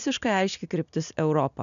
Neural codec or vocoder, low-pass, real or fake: none; 7.2 kHz; real